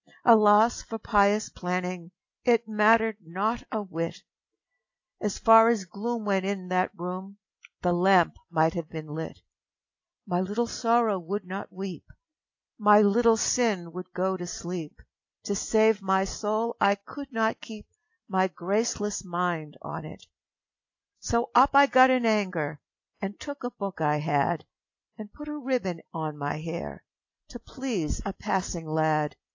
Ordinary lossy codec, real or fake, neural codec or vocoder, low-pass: AAC, 48 kbps; real; none; 7.2 kHz